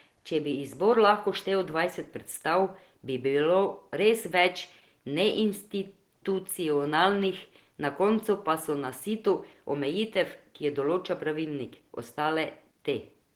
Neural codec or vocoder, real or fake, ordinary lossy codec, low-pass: none; real; Opus, 16 kbps; 19.8 kHz